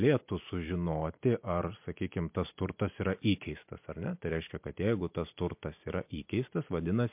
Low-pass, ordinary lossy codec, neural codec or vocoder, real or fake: 3.6 kHz; MP3, 32 kbps; vocoder, 44.1 kHz, 128 mel bands every 256 samples, BigVGAN v2; fake